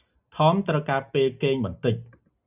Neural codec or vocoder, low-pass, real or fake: none; 3.6 kHz; real